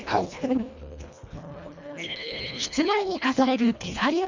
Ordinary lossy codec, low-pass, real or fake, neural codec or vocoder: MP3, 64 kbps; 7.2 kHz; fake; codec, 24 kHz, 1.5 kbps, HILCodec